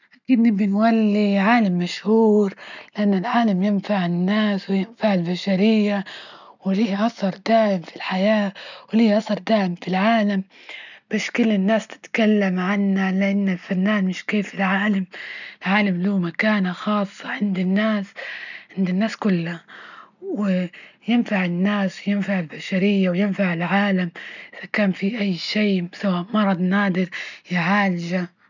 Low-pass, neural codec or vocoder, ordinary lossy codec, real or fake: 7.2 kHz; none; none; real